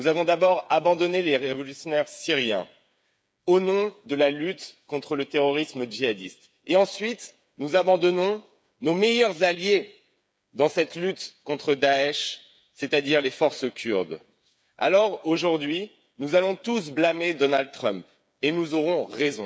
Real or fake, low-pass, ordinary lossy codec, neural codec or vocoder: fake; none; none; codec, 16 kHz, 8 kbps, FreqCodec, smaller model